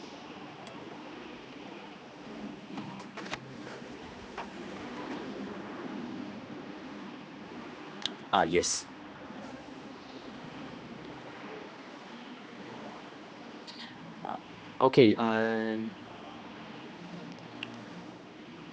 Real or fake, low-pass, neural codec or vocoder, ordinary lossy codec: fake; none; codec, 16 kHz, 2 kbps, X-Codec, HuBERT features, trained on general audio; none